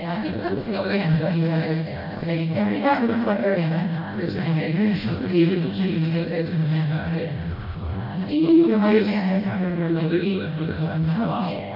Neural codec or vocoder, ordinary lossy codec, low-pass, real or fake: codec, 16 kHz, 0.5 kbps, FreqCodec, smaller model; AAC, 32 kbps; 5.4 kHz; fake